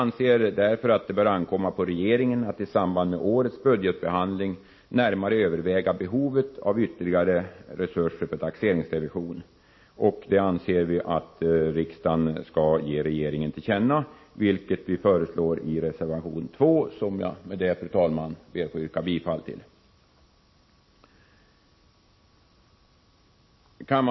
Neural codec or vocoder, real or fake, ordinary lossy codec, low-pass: none; real; MP3, 24 kbps; 7.2 kHz